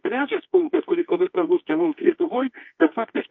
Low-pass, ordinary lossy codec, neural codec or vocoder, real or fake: 7.2 kHz; MP3, 48 kbps; codec, 24 kHz, 0.9 kbps, WavTokenizer, medium music audio release; fake